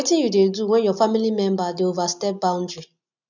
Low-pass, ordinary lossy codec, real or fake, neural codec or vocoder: 7.2 kHz; none; real; none